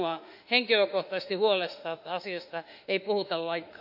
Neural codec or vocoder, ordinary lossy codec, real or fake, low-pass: autoencoder, 48 kHz, 32 numbers a frame, DAC-VAE, trained on Japanese speech; none; fake; 5.4 kHz